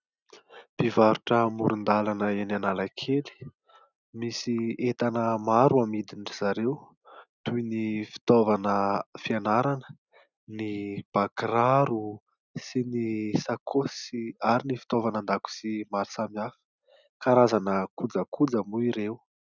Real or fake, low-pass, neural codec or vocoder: real; 7.2 kHz; none